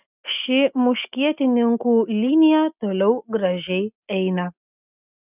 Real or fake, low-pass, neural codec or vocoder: real; 3.6 kHz; none